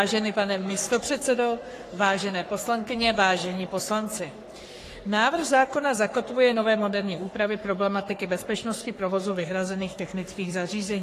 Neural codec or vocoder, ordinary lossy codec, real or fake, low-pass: codec, 44.1 kHz, 3.4 kbps, Pupu-Codec; AAC, 48 kbps; fake; 14.4 kHz